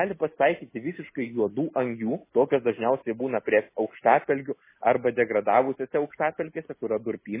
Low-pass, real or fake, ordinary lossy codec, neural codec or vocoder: 3.6 kHz; real; MP3, 16 kbps; none